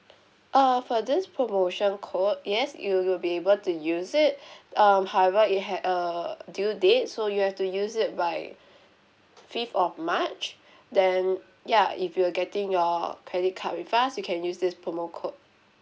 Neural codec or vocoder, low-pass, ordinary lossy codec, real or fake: none; none; none; real